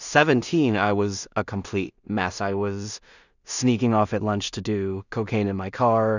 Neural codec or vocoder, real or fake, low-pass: codec, 16 kHz in and 24 kHz out, 0.4 kbps, LongCat-Audio-Codec, two codebook decoder; fake; 7.2 kHz